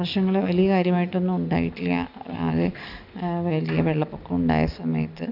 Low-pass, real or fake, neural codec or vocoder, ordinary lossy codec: 5.4 kHz; real; none; none